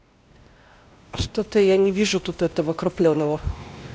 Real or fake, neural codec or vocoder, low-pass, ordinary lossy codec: fake; codec, 16 kHz, 1 kbps, X-Codec, WavLM features, trained on Multilingual LibriSpeech; none; none